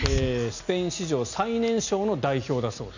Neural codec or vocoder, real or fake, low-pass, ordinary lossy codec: none; real; 7.2 kHz; none